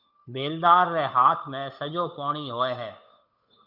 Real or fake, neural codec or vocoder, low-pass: fake; codec, 16 kHz, 8 kbps, FunCodec, trained on Chinese and English, 25 frames a second; 5.4 kHz